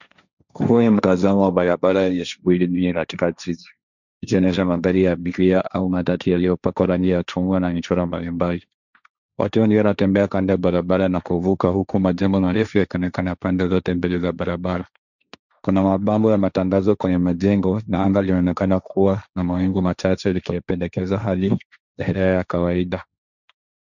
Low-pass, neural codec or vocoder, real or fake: 7.2 kHz; codec, 16 kHz, 1.1 kbps, Voila-Tokenizer; fake